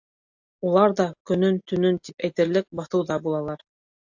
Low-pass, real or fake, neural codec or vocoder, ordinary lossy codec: 7.2 kHz; real; none; AAC, 48 kbps